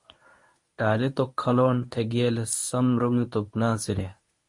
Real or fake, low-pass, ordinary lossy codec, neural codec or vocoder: fake; 10.8 kHz; MP3, 48 kbps; codec, 24 kHz, 0.9 kbps, WavTokenizer, medium speech release version 1